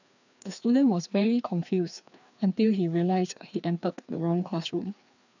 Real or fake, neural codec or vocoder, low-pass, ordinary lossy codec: fake; codec, 16 kHz, 2 kbps, FreqCodec, larger model; 7.2 kHz; none